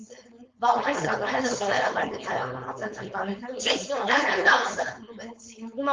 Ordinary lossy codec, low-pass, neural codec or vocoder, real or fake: Opus, 32 kbps; 7.2 kHz; codec, 16 kHz, 4.8 kbps, FACodec; fake